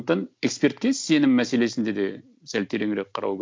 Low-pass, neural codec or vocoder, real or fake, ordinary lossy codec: none; none; real; none